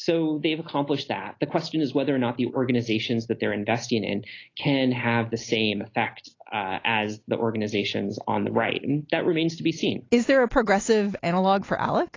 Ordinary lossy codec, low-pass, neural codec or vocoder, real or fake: AAC, 32 kbps; 7.2 kHz; none; real